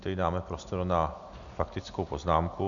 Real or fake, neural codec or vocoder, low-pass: real; none; 7.2 kHz